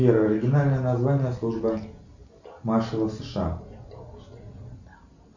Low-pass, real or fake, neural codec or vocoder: 7.2 kHz; real; none